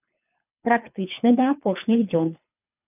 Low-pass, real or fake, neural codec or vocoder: 3.6 kHz; fake; codec, 24 kHz, 3 kbps, HILCodec